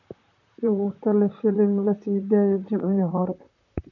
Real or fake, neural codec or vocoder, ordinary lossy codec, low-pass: fake; vocoder, 44.1 kHz, 128 mel bands, Pupu-Vocoder; none; 7.2 kHz